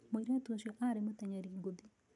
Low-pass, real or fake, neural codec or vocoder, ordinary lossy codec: 10.8 kHz; real; none; none